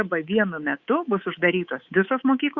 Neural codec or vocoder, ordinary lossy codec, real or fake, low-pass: codec, 24 kHz, 3.1 kbps, DualCodec; Opus, 64 kbps; fake; 7.2 kHz